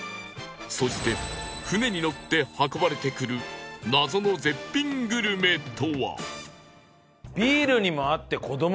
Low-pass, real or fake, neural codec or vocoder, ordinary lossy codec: none; real; none; none